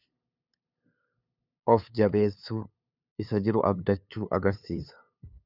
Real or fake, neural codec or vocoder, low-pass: fake; codec, 16 kHz, 2 kbps, FunCodec, trained on LibriTTS, 25 frames a second; 5.4 kHz